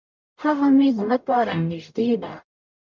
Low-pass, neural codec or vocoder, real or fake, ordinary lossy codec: 7.2 kHz; codec, 44.1 kHz, 0.9 kbps, DAC; fake; MP3, 64 kbps